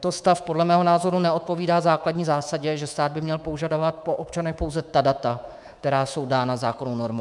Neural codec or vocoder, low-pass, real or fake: codec, 24 kHz, 3.1 kbps, DualCodec; 10.8 kHz; fake